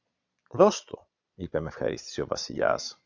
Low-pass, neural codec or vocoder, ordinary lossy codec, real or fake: 7.2 kHz; vocoder, 22.05 kHz, 80 mel bands, Vocos; AAC, 48 kbps; fake